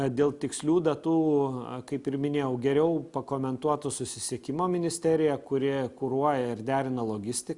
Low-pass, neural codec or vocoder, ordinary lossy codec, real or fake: 10.8 kHz; none; Opus, 64 kbps; real